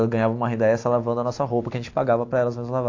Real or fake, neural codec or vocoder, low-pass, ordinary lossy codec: real; none; 7.2 kHz; AAC, 48 kbps